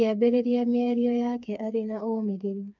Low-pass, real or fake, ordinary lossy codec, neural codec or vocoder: 7.2 kHz; fake; none; codec, 16 kHz, 4 kbps, FreqCodec, smaller model